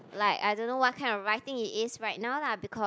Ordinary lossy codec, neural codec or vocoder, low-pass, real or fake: none; none; none; real